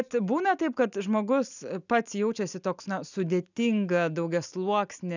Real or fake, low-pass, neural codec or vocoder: real; 7.2 kHz; none